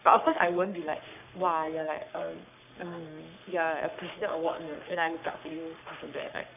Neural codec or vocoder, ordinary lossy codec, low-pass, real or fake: codec, 44.1 kHz, 3.4 kbps, Pupu-Codec; none; 3.6 kHz; fake